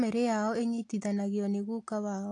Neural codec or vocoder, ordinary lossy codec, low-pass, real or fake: none; AAC, 48 kbps; 10.8 kHz; real